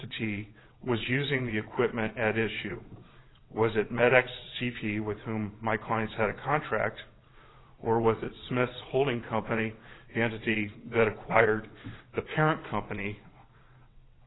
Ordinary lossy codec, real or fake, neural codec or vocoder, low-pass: AAC, 16 kbps; fake; vocoder, 22.05 kHz, 80 mel bands, WaveNeXt; 7.2 kHz